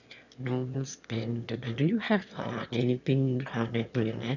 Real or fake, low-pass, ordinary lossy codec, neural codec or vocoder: fake; 7.2 kHz; none; autoencoder, 22.05 kHz, a latent of 192 numbers a frame, VITS, trained on one speaker